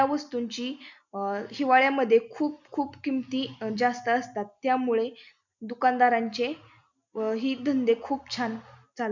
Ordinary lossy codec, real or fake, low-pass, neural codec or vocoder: none; real; 7.2 kHz; none